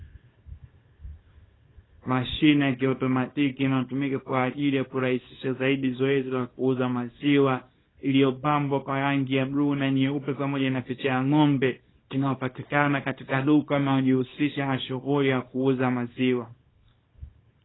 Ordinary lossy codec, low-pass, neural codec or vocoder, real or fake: AAC, 16 kbps; 7.2 kHz; codec, 24 kHz, 0.9 kbps, WavTokenizer, small release; fake